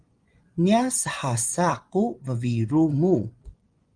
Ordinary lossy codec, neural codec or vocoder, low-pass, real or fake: Opus, 24 kbps; vocoder, 24 kHz, 100 mel bands, Vocos; 9.9 kHz; fake